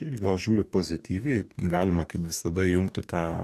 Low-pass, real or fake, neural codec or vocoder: 14.4 kHz; fake; codec, 44.1 kHz, 2.6 kbps, DAC